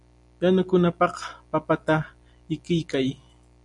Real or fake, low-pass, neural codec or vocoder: real; 10.8 kHz; none